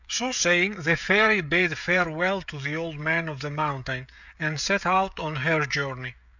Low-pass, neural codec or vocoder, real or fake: 7.2 kHz; codec, 16 kHz, 16 kbps, FreqCodec, smaller model; fake